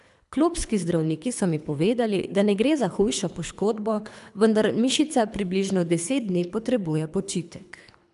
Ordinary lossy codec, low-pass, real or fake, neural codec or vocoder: none; 10.8 kHz; fake; codec, 24 kHz, 3 kbps, HILCodec